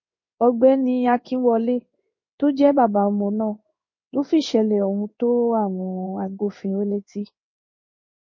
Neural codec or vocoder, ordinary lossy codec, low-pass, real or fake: codec, 16 kHz in and 24 kHz out, 1 kbps, XY-Tokenizer; MP3, 32 kbps; 7.2 kHz; fake